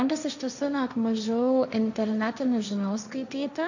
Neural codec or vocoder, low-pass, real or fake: codec, 16 kHz, 1.1 kbps, Voila-Tokenizer; 7.2 kHz; fake